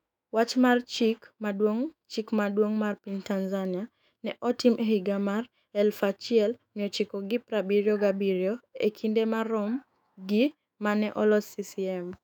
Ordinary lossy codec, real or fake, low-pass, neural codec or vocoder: none; fake; 19.8 kHz; autoencoder, 48 kHz, 128 numbers a frame, DAC-VAE, trained on Japanese speech